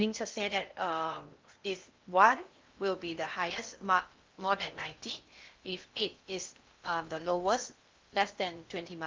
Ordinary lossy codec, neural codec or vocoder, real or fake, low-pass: Opus, 24 kbps; codec, 16 kHz in and 24 kHz out, 0.8 kbps, FocalCodec, streaming, 65536 codes; fake; 7.2 kHz